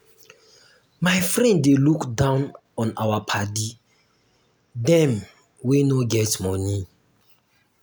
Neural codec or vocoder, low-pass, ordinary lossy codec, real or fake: none; none; none; real